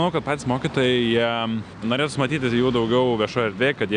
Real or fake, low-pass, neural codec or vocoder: real; 9.9 kHz; none